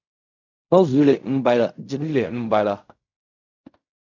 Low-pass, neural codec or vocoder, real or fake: 7.2 kHz; codec, 16 kHz in and 24 kHz out, 0.4 kbps, LongCat-Audio-Codec, fine tuned four codebook decoder; fake